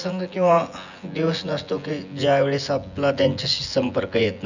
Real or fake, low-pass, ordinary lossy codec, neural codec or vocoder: fake; 7.2 kHz; none; vocoder, 24 kHz, 100 mel bands, Vocos